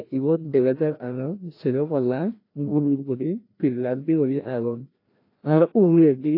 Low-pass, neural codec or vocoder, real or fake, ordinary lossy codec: 5.4 kHz; codec, 16 kHz, 1 kbps, FreqCodec, larger model; fake; AAC, 32 kbps